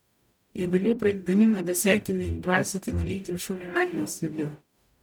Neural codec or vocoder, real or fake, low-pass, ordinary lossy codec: codec, 44.1 kHz, 0.9 kbps, DAC; fake; none; none